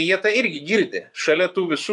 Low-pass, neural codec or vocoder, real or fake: 10.8 kHz; vocoder, 24 kHz, 100 mel bands, Vocos; fake